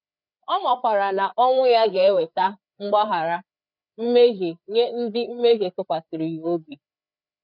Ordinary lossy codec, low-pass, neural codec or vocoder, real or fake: none; 5.4 kHz; codec, 16 kHz, 4 kbps, FreqCodec, larger model; fake